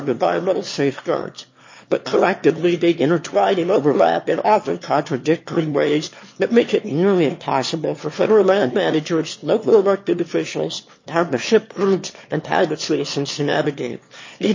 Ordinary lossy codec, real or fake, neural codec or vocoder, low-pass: MP3, 32 kbps; fake; autoencoder, 22.05 kHz, a latent of 192 numbers a frame, VITS, trained on one speaker; 7.2 kHz